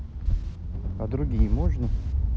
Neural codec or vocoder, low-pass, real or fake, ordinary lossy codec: none; none; real; none